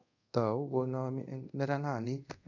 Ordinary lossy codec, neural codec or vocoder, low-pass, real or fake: none; codec, 24 kHz, 0.5 kbps, DualCodec; 7.2 kHz; fake